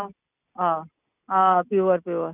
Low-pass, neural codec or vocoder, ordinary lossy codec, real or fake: 3.6 kHz; none; none; real